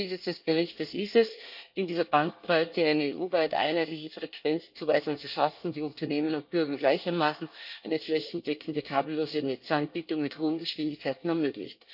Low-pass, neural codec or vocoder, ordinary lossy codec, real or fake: 5.4 kHz; codec, 24 kHz, 1 kbps, SNAC; none; fake